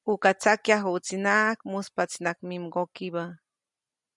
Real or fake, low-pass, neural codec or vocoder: real; 10.8 kHz; none